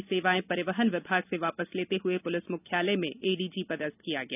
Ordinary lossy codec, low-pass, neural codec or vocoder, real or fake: none; 3.6 kHz; none; real